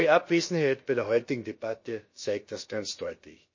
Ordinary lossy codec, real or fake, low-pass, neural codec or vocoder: MP3, 32 kbps; fake; 7.2 kHz; codec, 16 kHz, about 1 kbps, DyCAST, with the encoder's durations